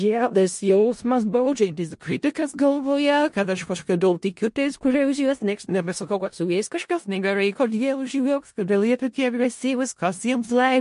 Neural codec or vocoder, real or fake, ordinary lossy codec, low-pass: codec, 16 kHz in and 24 kHz out, 0.4 kbps, LongCat-Audio-Codec, four codebook decoder; fake; MP3, 48 kbps; 10.8 kHz